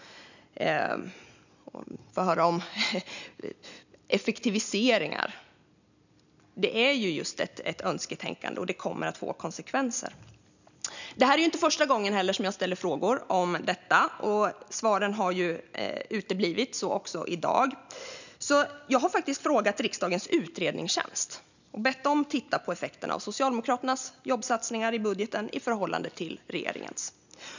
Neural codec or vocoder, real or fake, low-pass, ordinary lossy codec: none; real; 7.2 kHz; none